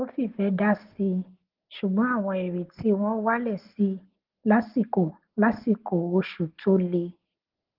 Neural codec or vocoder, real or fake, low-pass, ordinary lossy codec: none; real; 5.4 kHz; Opus, 16 kbps